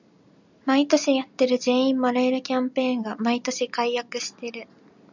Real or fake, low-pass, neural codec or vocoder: real; 7.2 kHz; none